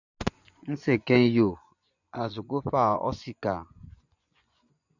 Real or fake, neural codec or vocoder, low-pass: fake; vocoder, 24 kHz, 100 mel bands, Vocos; 7.2 kHz